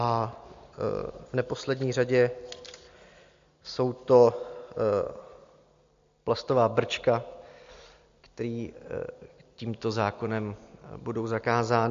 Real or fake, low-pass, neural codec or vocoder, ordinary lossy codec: real; 7.2 kHz; none; MP3, 48 kbps